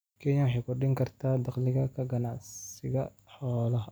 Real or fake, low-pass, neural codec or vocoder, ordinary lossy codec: real; none; none; none